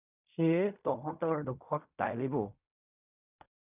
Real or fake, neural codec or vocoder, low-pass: fake; codec, 16 kHz in and 24 kHz out, 0.4 kbps, LongCat-Audio-Codec, fine tuned four codebook decoder; 3.6 kHz